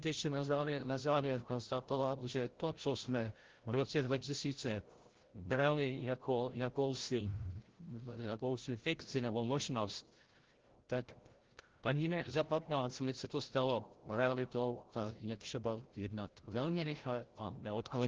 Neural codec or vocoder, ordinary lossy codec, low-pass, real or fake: codec, 16 kHz, 0.5 kbps, FreqCodec, larger model; Opus, 16 kbps; 7.2 kHz; fake